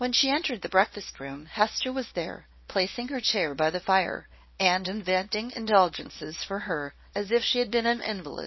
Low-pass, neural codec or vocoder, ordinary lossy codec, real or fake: 7.2 kHz; codec, 24 kHz, 0.9 kbps, WavTokenizer, small release; MP3, 24 kbps; fake